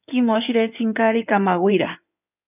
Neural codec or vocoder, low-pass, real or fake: codec, 16 kHz in and 24 kHz out, 2.2 kbps, FireRedTTS-2 codec; 3.6 kHz; fake